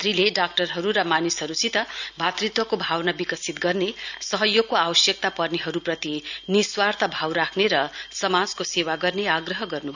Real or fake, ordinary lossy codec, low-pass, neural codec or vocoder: real; none; 7.2 kHz; none